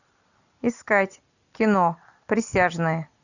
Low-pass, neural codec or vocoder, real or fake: 7.2 kHz; none; real